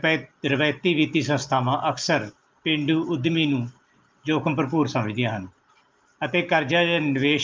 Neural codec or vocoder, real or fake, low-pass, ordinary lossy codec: none; real; 7.2 kHz; Opus, 24 kbps